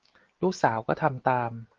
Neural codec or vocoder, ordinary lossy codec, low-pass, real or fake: none; Opus, 16 kbps; 7.2 kHz; real